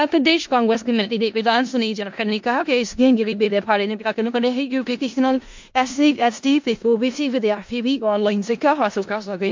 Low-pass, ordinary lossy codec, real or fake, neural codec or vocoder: 7.2 kHz; MP3, 48 kbps; fake; codec, 16 kHz in and 24 kHz out, 0.4 kbps, LongCat-Audio-Codec, four codebook decoder